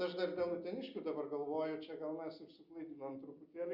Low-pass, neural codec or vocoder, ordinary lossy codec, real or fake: 5.4 kHz; none; Opus, 64 kbps; real